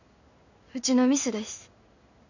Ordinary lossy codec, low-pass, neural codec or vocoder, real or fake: none; 7.2 kHz; codec, 16 kHz in and 24 kHz out, 1 kbps, XY-Tokenizer; fake